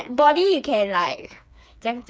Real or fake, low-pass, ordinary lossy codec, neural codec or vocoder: fake; none; none; codec, 16 kHz, 4 kbps, FreqCodec, smaller model